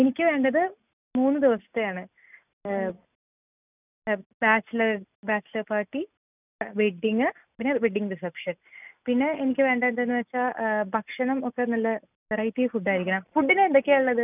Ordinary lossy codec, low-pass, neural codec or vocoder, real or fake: none; 3.6 kHz; none; real